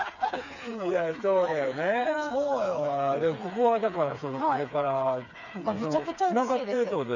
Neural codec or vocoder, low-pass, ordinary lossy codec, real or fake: codec, 16 kHz, 4 kbps, FreqCodec, smaller model; 7.2 kHz; none; fake